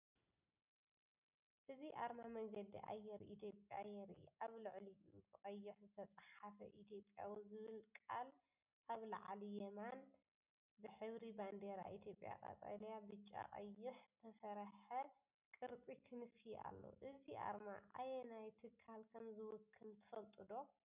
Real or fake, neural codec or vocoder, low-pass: real; none; 3.6 kHz